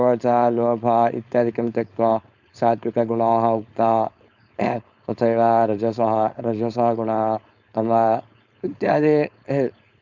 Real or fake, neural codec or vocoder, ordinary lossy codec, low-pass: fake; codec, 16 kHz, 4.8 kbps, FACodec; none; 7.2 kHz